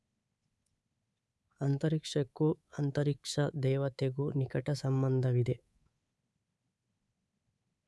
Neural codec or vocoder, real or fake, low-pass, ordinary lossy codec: codec, 24 kHz, 3.1 kbps, DualCodec; fake; none; none